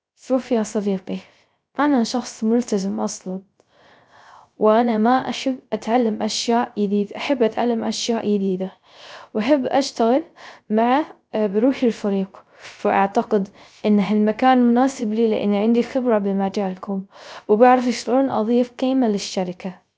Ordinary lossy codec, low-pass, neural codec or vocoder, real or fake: none; none; codec, 16 kHz, 0.3 kbps, FocalCodec; fake